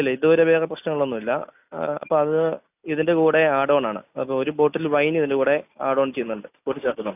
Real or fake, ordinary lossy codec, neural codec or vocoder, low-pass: real; none; none; 3.6 kHz